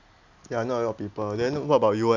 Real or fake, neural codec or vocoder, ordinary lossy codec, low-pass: real; none; none; 7.2 kHz